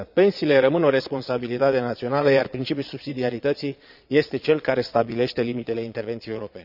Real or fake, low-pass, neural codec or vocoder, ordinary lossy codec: fake; 5.4 kHz; vocoder, 22.05 kHz, 80 mel bands, Vocos; none